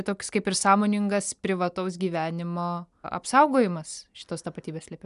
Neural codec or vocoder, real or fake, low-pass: none; real; 10.8 kHz